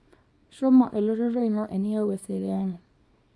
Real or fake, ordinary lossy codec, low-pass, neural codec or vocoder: fake; none; none; codec, 24 kHz, 0.9 kbps, WavTokenizer, small release